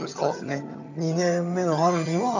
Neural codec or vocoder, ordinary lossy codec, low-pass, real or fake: vocoder, 22.05 kHz, 80 mel bands, HiFi-GAN; none; 7.2 kHz; fake